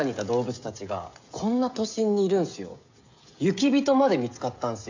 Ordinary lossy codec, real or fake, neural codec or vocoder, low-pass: AAC, 48 kbps; fake; codec, 16 kHz, 16 kbps, FreqCodec, smaller model; 7.2 kHz